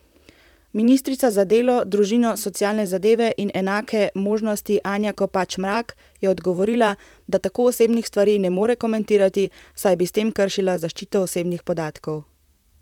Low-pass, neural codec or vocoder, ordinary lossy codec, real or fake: 19.8 kHz; vocoder, 44.1 kHz, 128 mel bands, Pupu-Vocoder; none; fake